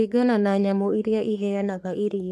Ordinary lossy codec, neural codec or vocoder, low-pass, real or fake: none; codec, 44.1 kHz, 3.4 kbps, Pupu-Codec; 14.4 kHz; fake